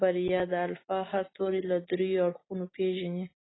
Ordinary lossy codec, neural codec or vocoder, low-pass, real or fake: AAC, 16 kbps; none; 7.2 kHz; real